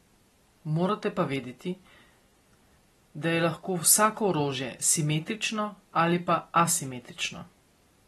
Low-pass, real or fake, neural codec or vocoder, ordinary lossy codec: 14.4 kHz; real; none; AAC, 32 kbps